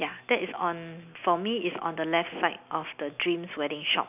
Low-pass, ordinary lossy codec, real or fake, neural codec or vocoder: 3.6 kHz; none; real; none